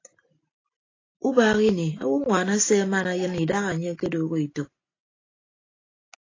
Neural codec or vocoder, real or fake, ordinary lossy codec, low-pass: none; real; AAC, 32 kbps; 7.2 kHz